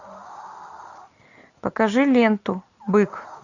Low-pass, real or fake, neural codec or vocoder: 7.2 kHz; real; none